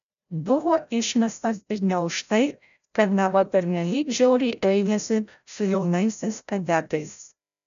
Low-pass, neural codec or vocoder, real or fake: 7.2 kHz; codec, 16 kHz, 0.5 kbps, FreqCodec, larger model; fake